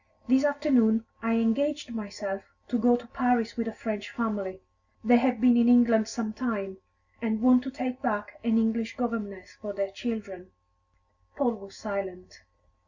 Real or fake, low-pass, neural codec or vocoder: real; 7.2 kHz; none